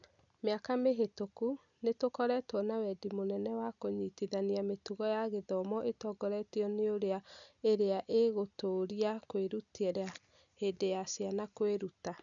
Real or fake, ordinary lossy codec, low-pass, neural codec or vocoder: real; none; 7.2 kHz; none